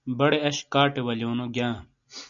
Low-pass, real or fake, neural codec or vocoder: 7.2 kHz; real; none